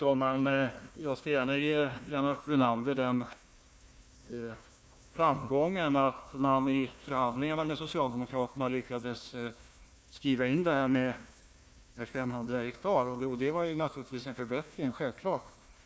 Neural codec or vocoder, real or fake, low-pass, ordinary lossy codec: codec, 16 kHz, 1 kbps, FunCodec, trained on Chinese and English, 50 frames a second; fake; none; none